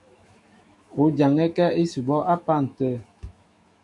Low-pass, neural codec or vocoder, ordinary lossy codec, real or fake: 10.8 kHz; autoencoder, 48 kHz, 128 numbers a frame, DAC-VAE, trained on Japanese speech; MP3, 64 kbps; fake